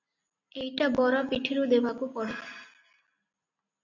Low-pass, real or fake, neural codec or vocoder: 7.2 kHz; real; none